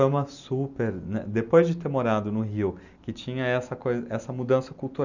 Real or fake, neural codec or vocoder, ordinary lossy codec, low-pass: real; none; none; 7.2 kHz